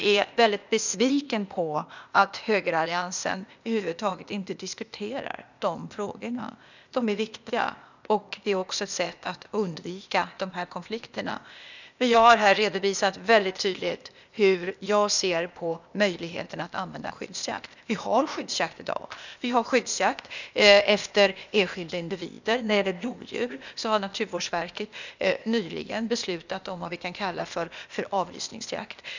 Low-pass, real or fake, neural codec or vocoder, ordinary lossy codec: 7.2 kHz; fake; codec, 16 kHz, 0.8 kbps, ZipCodec; none